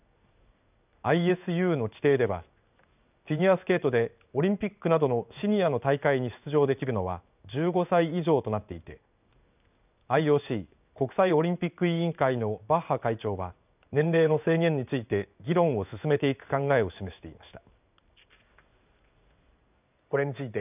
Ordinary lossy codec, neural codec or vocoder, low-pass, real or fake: none; codec, 16 kHz in and 24 kHz out, 1 kbps, XY-Tokenizer; 3.6 kHz; fake